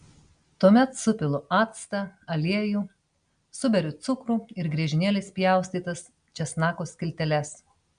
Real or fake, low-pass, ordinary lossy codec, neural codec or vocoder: real; 9.9 kHz; MP3, 64 kbps; none